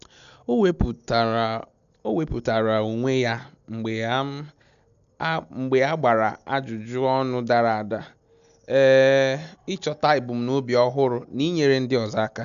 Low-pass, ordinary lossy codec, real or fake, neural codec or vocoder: 7.2 kHz; none; real; none